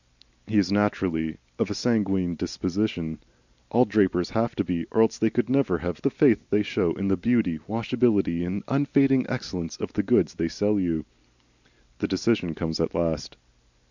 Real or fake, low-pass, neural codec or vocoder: real; 7.2 kHz; none